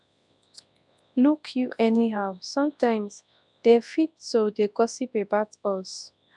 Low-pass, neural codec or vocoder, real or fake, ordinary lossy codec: 10.8 kHz; codec, 24 kHz, 0.9 kbps, WavTokenizer, large speech release; fake; none